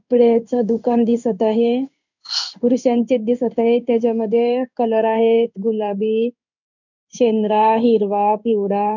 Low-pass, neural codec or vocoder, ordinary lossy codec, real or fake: 7.2 kHz; codec, 16 kHz in and 24 kHz out, 1 kbps, XY-Tokenizer; none; fake